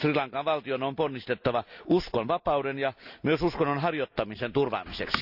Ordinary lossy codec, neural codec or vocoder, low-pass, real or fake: none; none; 5.4 kHz; real